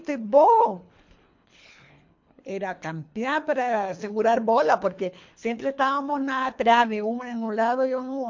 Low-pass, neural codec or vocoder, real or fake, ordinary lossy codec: 7.2 kHz; codec, 24 kHz, 3 kbps, HILCodec; fake; MP3, 48 kbps